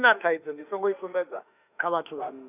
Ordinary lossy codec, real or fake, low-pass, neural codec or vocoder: none; fake; 3.6 kHz; autoencoder, 48 kHz, 32 numbers a frame, DAC-VAE, trained on Japanese speech